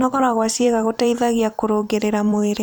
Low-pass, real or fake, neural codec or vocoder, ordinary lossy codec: none; fake; vocoder, 44.1 kHz, 128 mel bands every 256 samples, BigVGAN v2; none